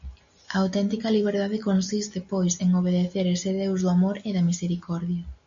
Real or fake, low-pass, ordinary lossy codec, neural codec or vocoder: real; 7.2 kHz; AAC, 64 kbps; none